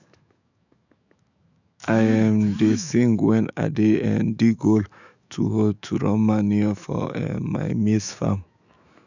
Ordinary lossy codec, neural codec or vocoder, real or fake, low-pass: none; autoencoder, 48 kHz, 128 numbers a frame, DAC-VAE, trained on Japanese speech; fake; 7.2 kHz